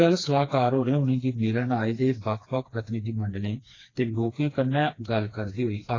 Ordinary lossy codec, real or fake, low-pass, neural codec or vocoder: AAC, 32 kbps; fake; 7.2 kHz; codec, 16 kHz, 2 kbps, FreqCodec, smaller model